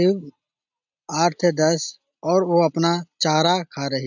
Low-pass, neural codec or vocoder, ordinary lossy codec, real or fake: 7.2 kHz; none; none; real